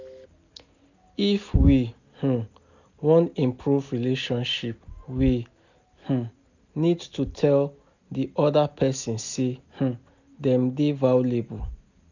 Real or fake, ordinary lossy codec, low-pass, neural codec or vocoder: real; none; 7.2 kHz; none